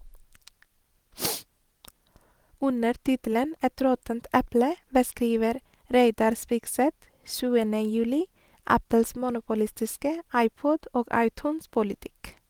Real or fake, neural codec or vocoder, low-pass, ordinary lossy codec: real; none; 19.8 kHz; Opus, 24 kbps